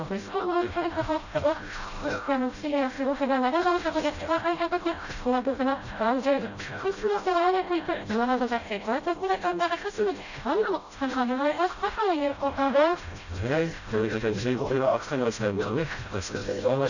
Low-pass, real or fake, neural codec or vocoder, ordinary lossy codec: 7.2 kHz; fake; codec, 16 kHz, 0.5 kbps, FreqCodec, smaller model; none